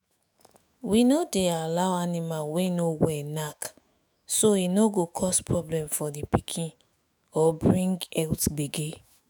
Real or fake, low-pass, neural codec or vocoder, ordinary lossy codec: fake; none; autoencoder, 48 kHz, 128 numbers a frame, DAC-VAE, trained on Japanese speech; none